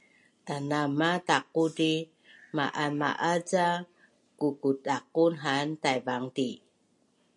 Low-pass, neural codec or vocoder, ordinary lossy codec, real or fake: 10.8 kHz; none; MP3, 64 kbps; real